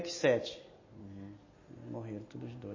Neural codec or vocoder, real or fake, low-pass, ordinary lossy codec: none; real; 7.2 kHz; MP3, 32 kbps